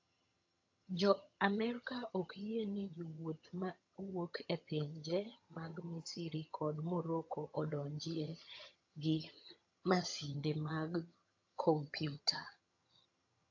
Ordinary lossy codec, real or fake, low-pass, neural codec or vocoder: AAC, 48 kbps; fake; 7.2 kHz; vocoder, 22.05 kHz, 80 mel bands, HiFi-GAN